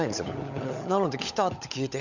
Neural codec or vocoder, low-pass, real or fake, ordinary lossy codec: codec, 16 kHz, 16 kbps, FunCodec, trained on LibriTTS, 50 frames a second; 7.2 kHz; fake; none